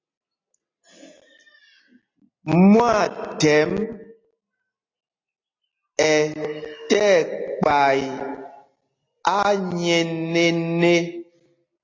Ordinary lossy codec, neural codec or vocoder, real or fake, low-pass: AAC, 32 kbps; none; real; 7.2 kHz